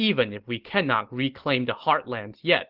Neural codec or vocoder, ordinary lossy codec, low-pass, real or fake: none; Opus, 16 kbps; 5.4 kHz; real